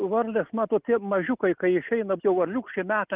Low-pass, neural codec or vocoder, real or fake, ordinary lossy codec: 3.6 kHz; none; real; Opus, 32 kbps